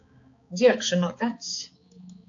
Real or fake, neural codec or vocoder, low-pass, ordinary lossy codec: fake; codec, 16 kHz, 4 kbps, X-Codec, HuBERT features, trained on balanced general audio; 7.2 kHz; AAC, 48 kbps